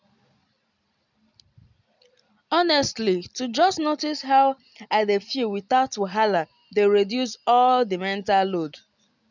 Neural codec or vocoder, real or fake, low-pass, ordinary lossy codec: none; real; 7.2 kHz; none